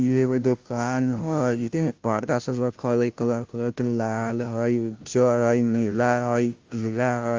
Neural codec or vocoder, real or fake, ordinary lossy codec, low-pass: codec, 16 kHz, 0.5 kbps, FunCodec, trained on Chinese and English, 25 frames a second; fake; Opus, 32 kbps; 7.2 kHz